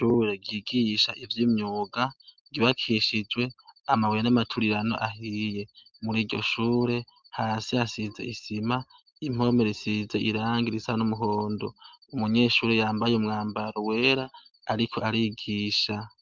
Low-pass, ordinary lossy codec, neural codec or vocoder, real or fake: 7.2 kHz; Opus, 24 kbps; none; real